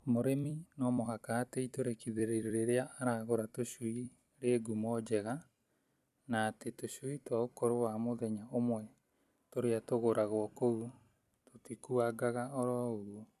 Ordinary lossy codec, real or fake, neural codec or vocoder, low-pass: none; fake; vocoder, 44.1 kHz, 128 mel bands every 256 samples, BigVGAN v2; 14.4 kHz